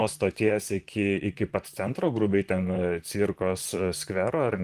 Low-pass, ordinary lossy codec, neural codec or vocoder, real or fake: 14.4 kHz; Opus, 24 kbps; vocoder, 44.1 kHz, 128 mel bands, Pupu-Vocoder; fake